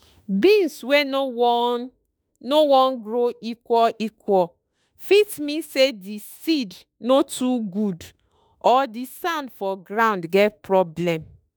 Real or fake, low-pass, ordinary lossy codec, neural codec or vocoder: fake; none; none; autoencoder, 48 kHz, 32 numbers a frame, DAC-VAE, trained on Japanese speech